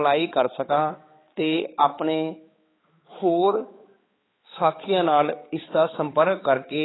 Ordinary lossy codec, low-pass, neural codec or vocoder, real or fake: AAC, 16 kbps; 7.2 kHz; codec, 16 kHz, 4 kbps, X-Codec, HuBERT features, trained on balanced general audio; fake